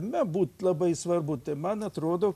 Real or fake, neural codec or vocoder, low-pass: real; none; 14.4 kHz